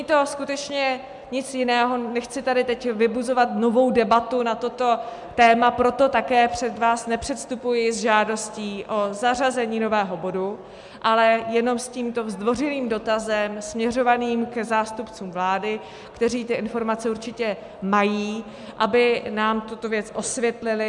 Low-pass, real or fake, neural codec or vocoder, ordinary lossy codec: 10.8 kHz; real; none; MP3, 96 kbps